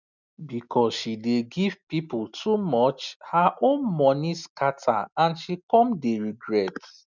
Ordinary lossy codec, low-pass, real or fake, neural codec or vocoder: none; 7.2 kHz; real; none